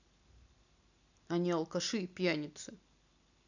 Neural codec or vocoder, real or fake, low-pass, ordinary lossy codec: none; real; 7.2 kHz; none